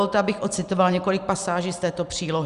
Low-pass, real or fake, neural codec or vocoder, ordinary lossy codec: 10.8 kHz; real; none; Opus, 64 kbps